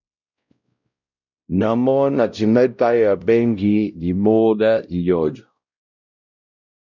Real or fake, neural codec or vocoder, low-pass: fake; codec, 16 kHz, 0.5 kbps, X-Codec, WavLM features, trained on Multilingual LibriSpeech; 7.2 kHz